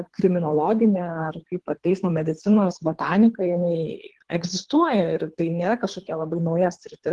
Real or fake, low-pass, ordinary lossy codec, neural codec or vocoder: fake; 10.8 kHz; Opus, 16 kbps; codec, 24 kHz, 3 kbps, HILCodec